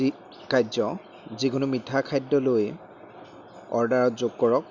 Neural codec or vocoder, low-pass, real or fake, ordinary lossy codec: none; 7.2 kHz; real; none